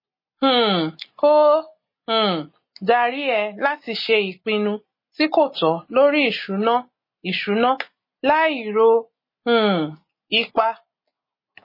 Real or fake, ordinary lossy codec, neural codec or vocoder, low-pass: real; MP3, 24 kbps; none; 5.4 kHz